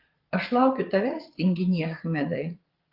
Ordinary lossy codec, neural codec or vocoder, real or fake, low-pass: Opus, 32 kbps; vocoder, 22.05 kHz, 80 mel bands, Vocos; fake; 5.4 kHz